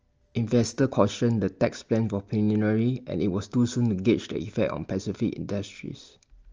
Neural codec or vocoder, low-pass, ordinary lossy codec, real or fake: none; 7.2 kHz; Opus, 24 kbps; real